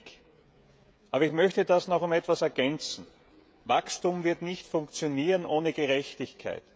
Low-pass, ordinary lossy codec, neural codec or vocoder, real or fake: none; none; codec, 16 kHz, 16 kbps, FreqCodec, smaller model; fake